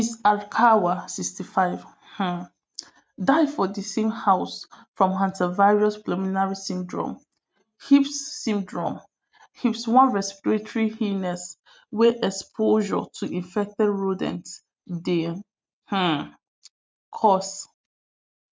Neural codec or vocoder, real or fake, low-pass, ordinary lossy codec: none; real; none; none